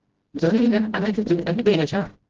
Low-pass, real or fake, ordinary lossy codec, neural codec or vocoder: 7.2 kHz; fake; Opus, 16 kbps; codec, 16 kHz, 0.5 kbps, FreqCodec, smaller model